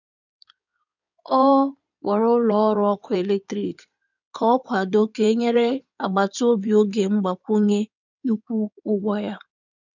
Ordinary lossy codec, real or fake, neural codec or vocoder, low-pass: none; fake; codec, 16 kHz in and 24 kHz out, 2.2 kbps, FireRedTTS-2 codec; 7.2 kHz